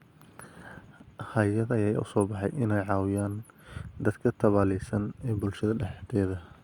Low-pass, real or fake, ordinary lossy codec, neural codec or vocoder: 19.8 kHz; real; Opus, 64 kbps; none